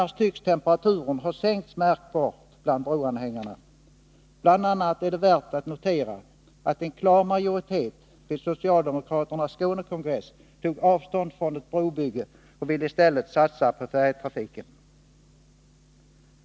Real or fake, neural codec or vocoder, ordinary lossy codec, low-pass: real; none; none; none